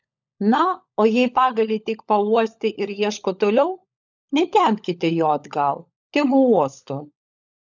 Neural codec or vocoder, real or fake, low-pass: codec, 16 kHz, 16 kbps, FunCodec, trained on LibriTTS, 50 frames a second; fake; 7.2 kHz